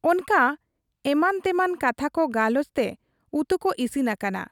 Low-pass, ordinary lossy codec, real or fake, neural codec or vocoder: 19.8 kHz; none; real; none